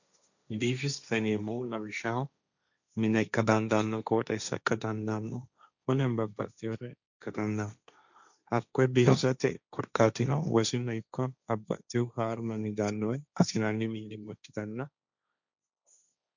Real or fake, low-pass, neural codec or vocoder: fake; 7.2 kHz; codec, 16 kHz, 1.1 kbps, Voila-Tokenizer